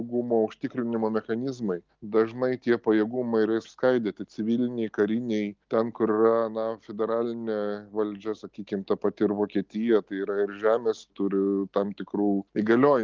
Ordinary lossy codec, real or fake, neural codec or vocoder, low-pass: Opus, 32 kbps; real; none; 7.2 kHz